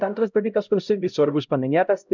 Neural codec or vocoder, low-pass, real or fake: codec, 16 kHz, 0.5 kbps, X-Codec, HuBERT features, trained on LibriSpeech; 7.2 kHz; fake